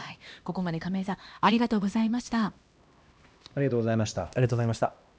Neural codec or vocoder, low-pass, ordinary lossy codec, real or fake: codec, 16 kHz, 1 kbps, X-Codec, HuBERT features, trained on LibriSpeech; none; none; fake